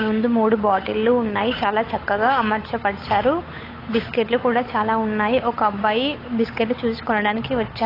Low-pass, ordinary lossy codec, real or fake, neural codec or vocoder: 5.4 kHz; AAC, 24 kbps; fake; codec, 16 kHz, 8 kbps, FunCodec, trained on Chinese and English, 25 frames a second